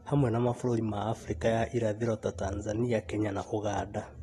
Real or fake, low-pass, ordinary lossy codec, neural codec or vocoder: real; 19.8 kHz; AAC, 32 kbps; none